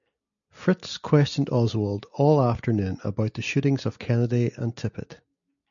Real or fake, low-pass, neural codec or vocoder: real; 7.2 kHz; none